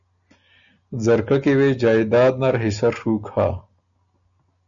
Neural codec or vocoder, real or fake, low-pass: none; real; 7.2 kHz